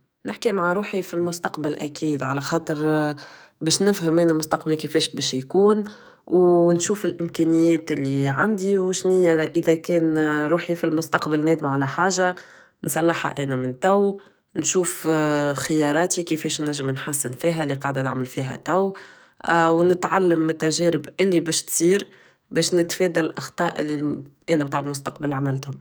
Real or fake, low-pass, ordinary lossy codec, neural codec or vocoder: fake; none; none; codec, 44.1 kHz, 2.6 kbps, SNAC